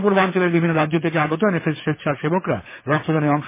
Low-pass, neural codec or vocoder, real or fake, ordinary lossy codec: 3.6 kHz; codec, 24 kHz, 6 kbps, HILCodec; fake; MP3, 16 kbps